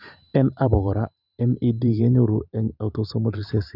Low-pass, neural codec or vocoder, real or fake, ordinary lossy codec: 5.4 kHz; vocoder, 44.1 kHz, 128 mel bands every 512 samples, BigVGAN v2; fake; none